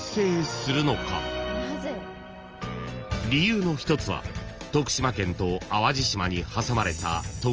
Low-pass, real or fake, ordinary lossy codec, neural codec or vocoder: 7.2 kHz; real; Opus, 24 kbps; none